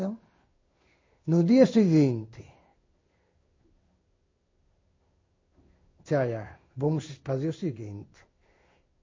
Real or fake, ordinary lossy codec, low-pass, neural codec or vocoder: fake; MP3, 32 kbps; 7.2 kHz; codec, 16 kHz in and 24 kHz out, 1 kbps, XY-Tokenizer